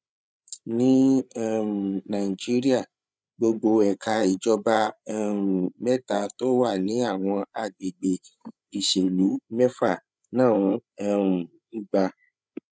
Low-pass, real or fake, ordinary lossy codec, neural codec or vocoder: none; fake; none; codec, 16 kHz, 8 kbps, FreqCodec, larger model